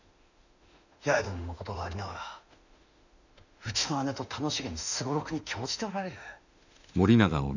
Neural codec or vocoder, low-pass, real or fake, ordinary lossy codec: autoencoder, 48 kHz, 32 numbers a frame, DAC-VAE, trained on Japanese speech; 7.2 kHz; fake; none